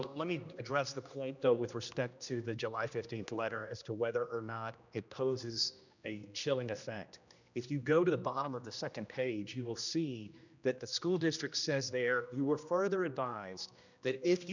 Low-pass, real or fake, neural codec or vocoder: 7.2 kHz; fake; codec, 16 kHz, 1 kbps, X-Codec, HuBERT features, trained on general audio